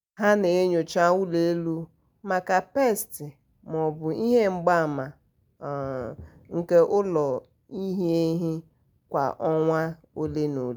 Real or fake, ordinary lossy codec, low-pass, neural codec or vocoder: real; none; none; none